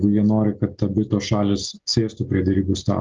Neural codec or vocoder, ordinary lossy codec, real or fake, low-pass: none; Opus, 32 kbps; real; 7.2 kHz